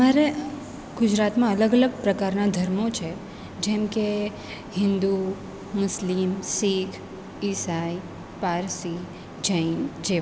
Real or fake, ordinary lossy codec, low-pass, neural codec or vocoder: real; none; none; none